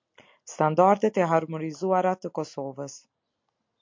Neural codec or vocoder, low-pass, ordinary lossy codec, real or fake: none; 7.2 kHz; MP3, 48 kbps; real